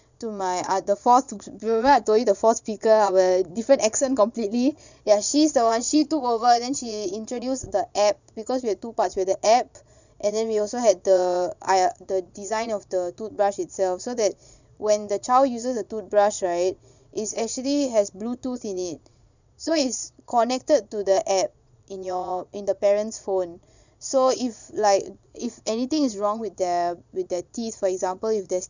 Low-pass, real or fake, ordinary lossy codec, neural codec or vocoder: 7.2 kHz; fake; none; vocoder, 22.05 kHz, 80 mel bands, Vocos